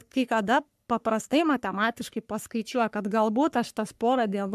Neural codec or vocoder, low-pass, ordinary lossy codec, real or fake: codec, 44.1 kHz, 3.4 kbps, Pupu-Codec; 14.4 kHz; MP3, 96 kbps; fake